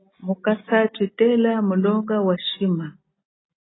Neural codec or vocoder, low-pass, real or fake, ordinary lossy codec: none; 7.2 kHz; real; AAC, 16 kbps